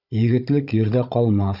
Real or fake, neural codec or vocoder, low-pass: fake; codec, 16 kHz, 16 kbps, FunCodec, trained on Chinese and English, 50 frames a second; 5.4 kHz